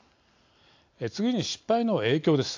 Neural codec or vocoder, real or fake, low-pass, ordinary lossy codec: none; real; 7.2 kHz; AAC, 48 kbps